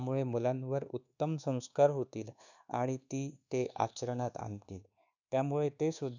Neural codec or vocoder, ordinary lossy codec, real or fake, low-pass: codec, 24 kHz, 1.2 kbps, DualCodec; none; fake; 7.2 kHz